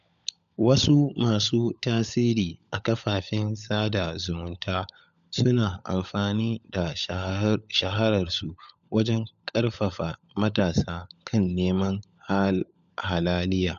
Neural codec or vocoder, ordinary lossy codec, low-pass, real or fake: codec, 16 kHz, 16 kbps, FunCodec, trained on LibriTTS, 50 frames a second; AAC, 96 kbps; 7.2 kHz; fake